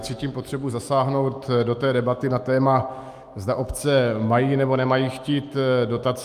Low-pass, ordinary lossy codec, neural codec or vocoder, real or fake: 14.4 kHz; Opus, 32 kbps; autoencoder, 48 kHz, 128 numbers a frame, DAC-VAE, trained on Japanese speech; fake